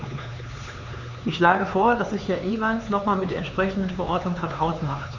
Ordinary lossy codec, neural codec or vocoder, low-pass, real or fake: none; codec, 16 kHz, 4 kbps, X-Codec, HuBERT features, trained on LibriSpeech; 7.2 kHz; fake